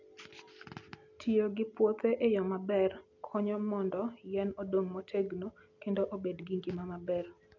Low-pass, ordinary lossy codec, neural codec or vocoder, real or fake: 7.2 kHz; none; none; real